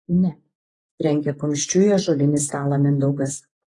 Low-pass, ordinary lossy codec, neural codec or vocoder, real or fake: 10.8 kHz; AAC, 32 kbps; vocoder, 48 kHz, 128 mel bands, Vocos; fake